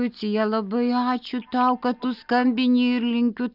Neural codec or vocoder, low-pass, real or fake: none; 5.4 kHz; real